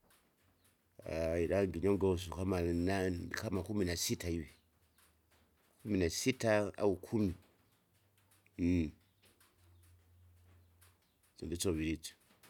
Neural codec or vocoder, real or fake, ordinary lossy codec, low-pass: none; real; none; 19.8 kHz